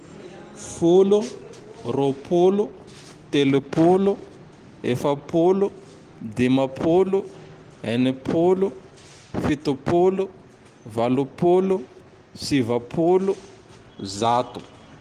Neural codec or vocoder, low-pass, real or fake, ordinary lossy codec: none; 14.4 kHz; real; Opus, 24 kbps